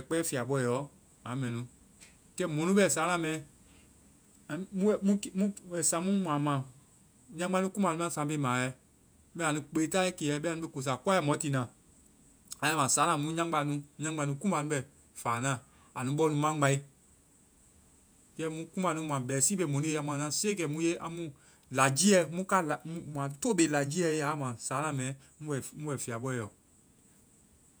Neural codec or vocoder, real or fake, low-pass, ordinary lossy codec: vocoder, 48 kHz, 128 mel bands, Vocos; fake; none; none